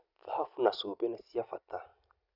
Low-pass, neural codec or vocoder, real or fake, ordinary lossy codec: 5.4 kHz; none; real; Opus, 64 kbps